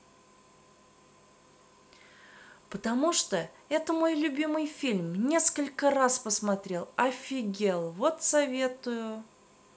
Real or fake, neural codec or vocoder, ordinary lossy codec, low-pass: real; none; none; none